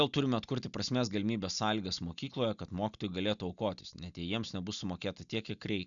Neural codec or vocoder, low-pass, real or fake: none; 7.2 kHz; real